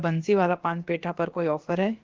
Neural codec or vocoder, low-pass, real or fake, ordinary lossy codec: codec, 16 kHz, about 1 kbps, DyCAST, with the encoder's durations; 7.2 kHz; fake; Opus, 16 kbps